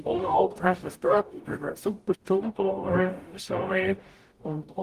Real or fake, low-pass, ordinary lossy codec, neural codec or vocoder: fake; 14.4 kHz; Opus, 24 kbps; codec, 44.1 kHz, 0.9 kbps, DAC